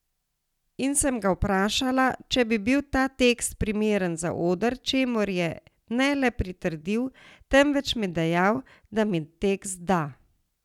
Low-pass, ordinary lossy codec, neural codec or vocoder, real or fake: 19.8 kHz; none; none; real